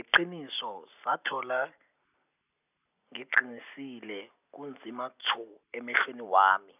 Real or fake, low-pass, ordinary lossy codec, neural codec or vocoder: real; 3.6 kHz; none; none